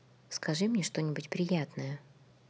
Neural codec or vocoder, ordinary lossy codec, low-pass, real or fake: none; none; none; real